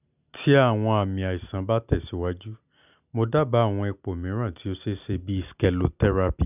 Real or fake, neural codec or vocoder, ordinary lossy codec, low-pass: real; none; none; 3.6 kHz